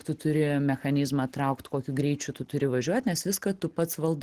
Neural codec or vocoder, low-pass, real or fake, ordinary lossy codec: none; 14.4 kHz; real; Opus, 16 kbps